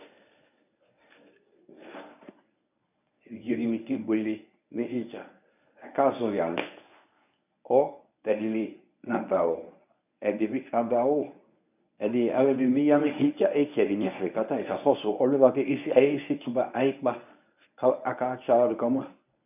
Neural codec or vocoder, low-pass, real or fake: codec, 24 kHz, 0.9 kbps, WavTokenizer, medium speech release version 1; 3.6 kHz; fake